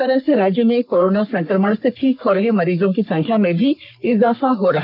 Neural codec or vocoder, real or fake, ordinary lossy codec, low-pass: codec, 44.1 kHz, 3.4 kbps, Pupu-Codec; fake; none; 5.4 kHz